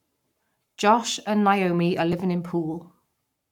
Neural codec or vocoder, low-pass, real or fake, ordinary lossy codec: codec, 44.1 kHz, 7.8 kbps, Pupu-Codec; 19.8 kHz; fake; none